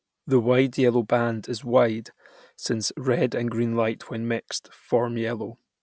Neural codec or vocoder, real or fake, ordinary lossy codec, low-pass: none; real; none; none